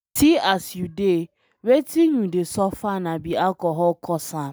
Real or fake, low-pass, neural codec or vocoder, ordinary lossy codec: real; none; none; none